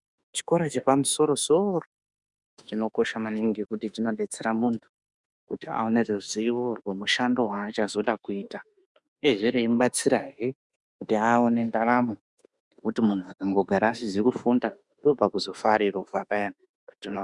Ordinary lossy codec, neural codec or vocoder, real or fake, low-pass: Opus, 64 kbps; autoencoder, 48 kHz, 32 numbers a frame, DAC-VAE, trained on Japanese speech; fake; 10.8 kHz